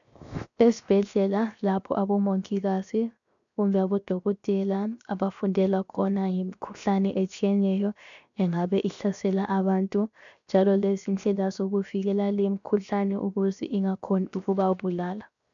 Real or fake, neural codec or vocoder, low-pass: fake; codec, 16 kHz, 0.7 kbps, FocalCodec; 7.2 kHz